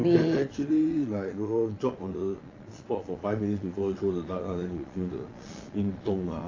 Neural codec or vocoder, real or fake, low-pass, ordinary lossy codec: vocoder, 22.05 kHz, 80 mel bands, Vocos; fake; 7.2 kHz; AAC, 32 kbps